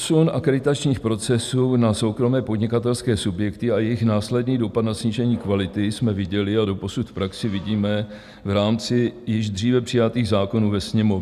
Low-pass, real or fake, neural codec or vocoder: 14.4 kHz; real; none